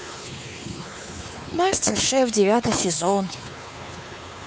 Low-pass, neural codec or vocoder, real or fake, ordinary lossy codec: none; codec, 16 kHz, 4 kbps, X-Codec, WavLM features, trained on Multilingual LibriSpeech; fake; none